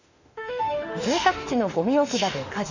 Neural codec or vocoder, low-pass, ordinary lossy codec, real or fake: autoencoder, 48 kHz, 32 numbers a frame, DAC-VAE, trained on Japanese speech; 7.2 kHz; none; fake